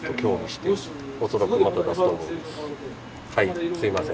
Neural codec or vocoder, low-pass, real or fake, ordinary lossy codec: none; none; real; none